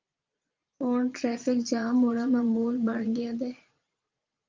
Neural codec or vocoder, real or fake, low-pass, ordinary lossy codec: vocoder, 22.05 kHz, 80 mel bands, Vocos; fake; 7.2 kHz; Opus, 24 kbps